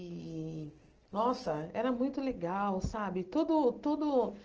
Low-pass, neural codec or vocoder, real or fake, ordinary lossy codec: 7.2 kHz; none; real; Opus, 16 kbps